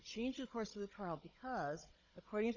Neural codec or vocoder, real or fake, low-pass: codec, 16 kHz, 16 kbps, FunCodec, trained on Chinese and English, 50 frames a second; fake; 7.2 kHz